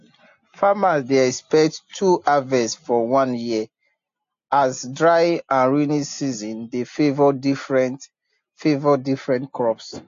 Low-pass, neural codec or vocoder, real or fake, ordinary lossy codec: 7.2 kHz; none; real; AAC, 48 kbps